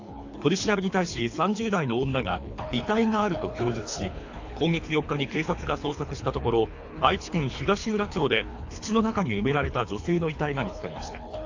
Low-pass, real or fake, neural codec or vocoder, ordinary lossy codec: 7.2 kHz; fake; codec, 24 kHz, 3 kbps, HILCodec; AAC, 48 kbps